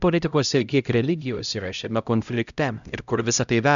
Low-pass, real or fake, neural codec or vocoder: 7.2 kHz; fake; codec, 16 kHz, 0.5 kbps, X-Codec, HuBERT features, trained on LibriSpeech